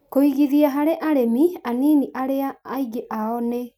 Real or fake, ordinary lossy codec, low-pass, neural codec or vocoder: real; none; 19.8 kHz; none